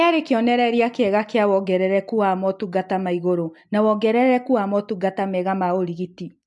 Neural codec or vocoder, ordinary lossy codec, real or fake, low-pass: none; MP3, 64 kbps; real; 10.8 kHz